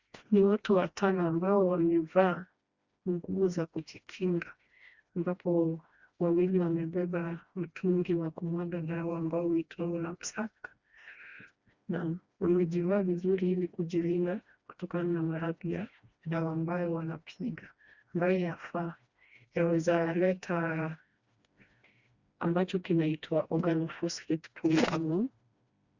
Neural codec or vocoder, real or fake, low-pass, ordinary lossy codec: codec, 16 kHz, 1 kbps, FreqCodec, smaller model; fake; 7.2 kHz; Opus, 64 kbps